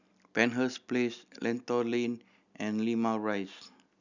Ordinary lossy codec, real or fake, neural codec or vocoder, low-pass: none; real; none; 7.2 kHz